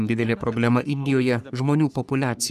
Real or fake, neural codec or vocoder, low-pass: fake; codec, 44.1 kHz, 3.4 kbps, Pupu-Codec; 14.4 kHz